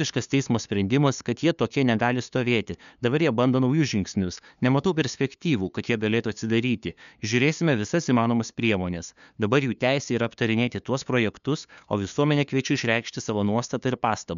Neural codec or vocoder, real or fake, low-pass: codec, 16 kHz, 2 kbps, FunCodec, trained on LibriTTS, 25 frames a second; fake; 7.2 kHz